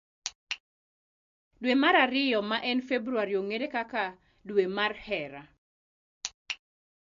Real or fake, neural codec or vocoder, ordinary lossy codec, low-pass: real; none; MP3, 48 kbps; 7.2 kHz